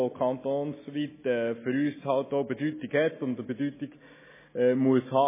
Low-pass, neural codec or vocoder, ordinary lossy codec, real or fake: 3.6 kHz; none; MP3, 16 kbps; real